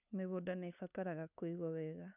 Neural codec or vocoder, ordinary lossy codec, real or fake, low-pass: codec, 16 kHz, 2 kbps, FunCodec, trained on LibriTTS, 25 frames a second; none; fake; 3.6 kHz